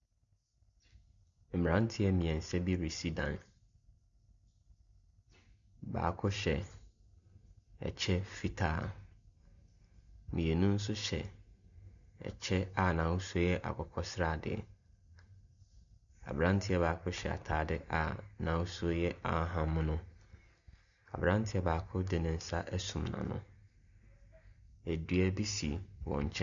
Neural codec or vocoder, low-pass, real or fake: none; 7.2 kHz; real